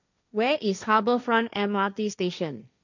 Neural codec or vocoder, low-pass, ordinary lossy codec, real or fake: codec, 16 kHz, 1.1 kbps, Voila-Tokenizer; 7.2 kHz; none; fake